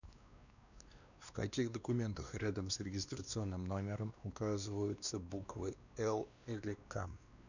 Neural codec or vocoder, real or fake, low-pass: codec, 16 kHz, 2 kbps, X-Codec, WavLM features, trained on Multilingual LibriSpeech; fake; 7.2 kHz